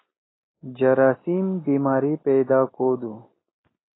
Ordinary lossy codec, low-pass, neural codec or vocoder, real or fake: AAC, 16 kbps; 7.2 kHz; none; real